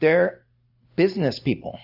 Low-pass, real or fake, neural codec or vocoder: 5.4 kHz; real; none